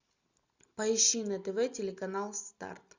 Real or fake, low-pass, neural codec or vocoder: real; 7.2 kHz; none